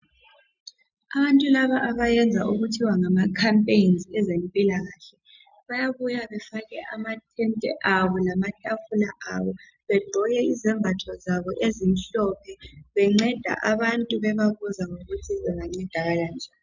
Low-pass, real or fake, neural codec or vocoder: 7.2 kHz; real; none